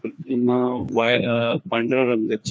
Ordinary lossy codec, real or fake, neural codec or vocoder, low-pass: none; fake; codec, 16 kHz, 2 kbps, FreqCodec, larger model; none